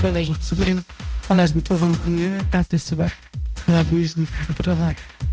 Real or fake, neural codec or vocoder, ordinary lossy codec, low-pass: fake; codec, 16 kHz, 0.5 kbps, X-Codec, HuBERT features, trained on balanced general audio; none; none